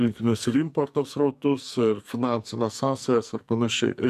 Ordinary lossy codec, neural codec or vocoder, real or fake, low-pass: AAC, 96 kbps; codec, 44.1 kHz, 2.6 kbps, SNAC; fake; 14.4 kHz